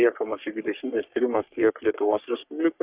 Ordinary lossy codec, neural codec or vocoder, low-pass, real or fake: Opus, 64 kbps; codec, 44.1 kHz, 3.4 kbps, Pupu-Codec; 3.6 kHz; fake